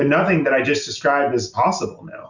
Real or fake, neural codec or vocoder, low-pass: real; none; 7.2 kHz